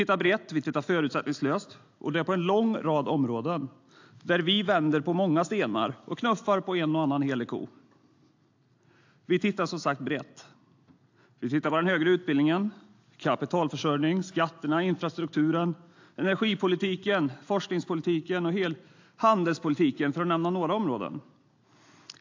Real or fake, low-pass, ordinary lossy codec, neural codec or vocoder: real; 7.2 kHz; AAC, 48 kbps; none